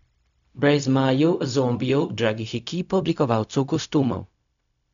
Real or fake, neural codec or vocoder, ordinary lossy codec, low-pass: fake; codec, 16 kHz, 0.4 kbps, LongCat-Audio-Codec; none; 7.2 kHz